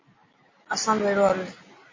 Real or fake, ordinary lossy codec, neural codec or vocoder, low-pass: real; MP3, 32 kbps; none; 7.2 kHz